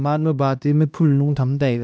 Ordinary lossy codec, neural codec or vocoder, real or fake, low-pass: none; codec, 16 kHz, 1 kbps, X-Codec, HuBERT features, trained on LibriSpeech; fake; none